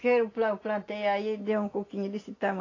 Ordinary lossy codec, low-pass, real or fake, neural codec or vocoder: AAC, 32 kbps; 7.2 kHz; real; none